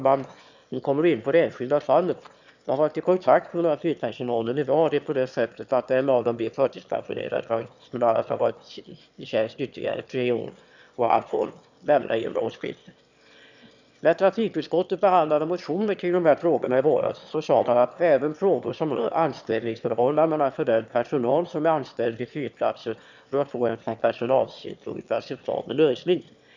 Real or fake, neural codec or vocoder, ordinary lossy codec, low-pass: fake; autoencoder, 22.05 kHz, a latent of 192 numbers a frame, VITS, trained on one speaker; none; 7.2 kHz